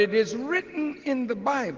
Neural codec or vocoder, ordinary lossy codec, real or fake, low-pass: none; Opus, 16 kbps; real; 7.2 kHz